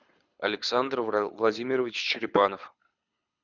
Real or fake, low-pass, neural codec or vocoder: fake; 7.2 kHz; codec, 24 kHz, 6 kbps, HILCodec